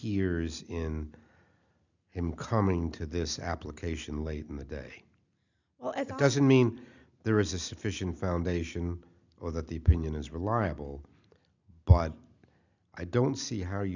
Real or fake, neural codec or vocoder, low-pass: real; none; 7.2 kHz